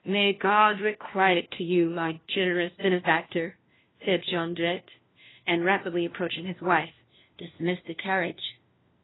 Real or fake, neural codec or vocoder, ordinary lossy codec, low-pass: fake; codec, 16 kHz, 1 kbps, FunCodec, trained on LibriTTS, 50 frames a second; AAC, 16 kbps; 7.2 kHz